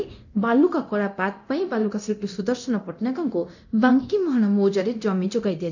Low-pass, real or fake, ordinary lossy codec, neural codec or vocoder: 7.2 kHz; fake; none; codec, 24 kHz, 0.9 kbps, DualCodec